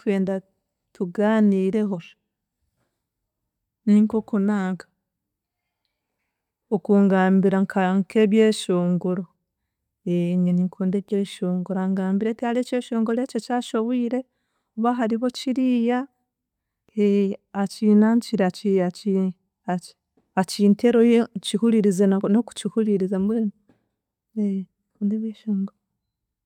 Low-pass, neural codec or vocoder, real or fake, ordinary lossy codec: 19.8 kHz; none; real; none